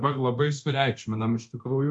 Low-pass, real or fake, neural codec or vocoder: 10.8 kHz; fake; codec, 24 kHz, 0.9 kbps, DualCodec